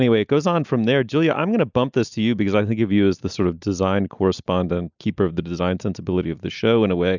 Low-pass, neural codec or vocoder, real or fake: 7.2 kHz; none; real